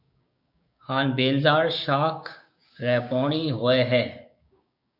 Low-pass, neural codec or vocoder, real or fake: 5.4 kHz; codec, 16 kHz, 6 kbps, DAC; fake